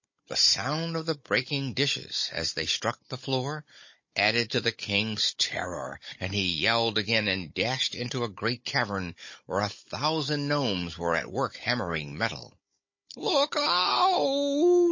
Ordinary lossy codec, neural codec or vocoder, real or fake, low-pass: MP3, 32 kbps; codec, 16 kHz, 16 kbps, FunCodec, trained on Chinese and English, 50 frames a second; fake; 7.2 kHz